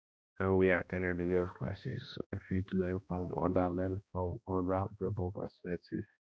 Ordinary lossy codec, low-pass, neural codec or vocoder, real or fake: none; none; codec, 16 kHz, 1 kbps, X-Codec, HuBERT features, trained on balanced general audio; fake